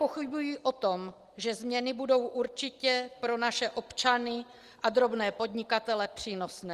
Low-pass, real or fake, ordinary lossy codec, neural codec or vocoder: 14.4 kHz; real; Opus, 24 kbps; none